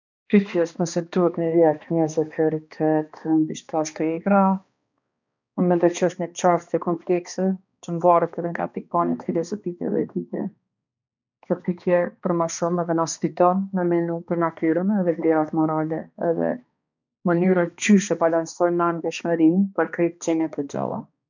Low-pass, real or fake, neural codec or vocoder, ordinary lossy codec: 7.2 kHz; fake; codec, 16 kHz, 2 kbps, X-Codec, HuBERT features, trained on balanced general audio; none